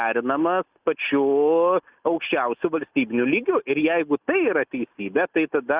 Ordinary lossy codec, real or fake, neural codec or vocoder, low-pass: Opus, 64 kbps; real; none; 3.6 kHz